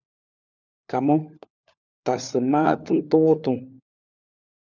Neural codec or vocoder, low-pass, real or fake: codec, 16 kHz, 4 kbps, FunCodec, trained on LibriTTS, 50 frames a second; 7.2 kHz; fake